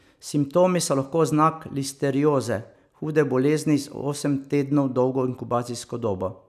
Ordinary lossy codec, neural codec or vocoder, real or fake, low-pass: none; none; real; 14.4 kHz